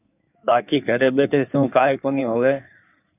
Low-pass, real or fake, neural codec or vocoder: 3.6 kHz; fake; codec, 16 kHz in and 24 kHz out, 1.1 kbps, FireRedTTS-2 codec